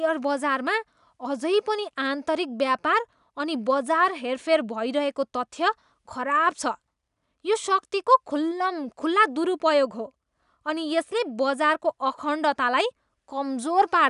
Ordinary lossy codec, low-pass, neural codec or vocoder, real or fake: none; 10.8 kHz; none; real